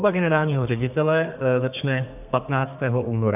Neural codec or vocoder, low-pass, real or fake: codec, 32 kHz, 1.9 kbps, SNAC; 3.6 kHz; fake